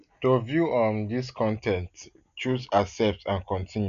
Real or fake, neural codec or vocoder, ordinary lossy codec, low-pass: real; none; none; 7.2 kHz